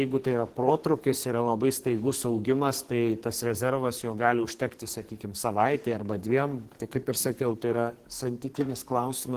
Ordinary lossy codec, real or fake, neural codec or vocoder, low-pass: Opus, 16 kbps; fake; codec, 44.1 kHz, 2.6 kbps, SNAC; 14.4 kHz